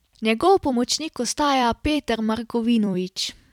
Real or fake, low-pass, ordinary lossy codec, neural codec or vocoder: fake; 19.8 kHz; none; vocoder, 44.1 kHz, 128 mel bands every 256 samples, BigVGAN v2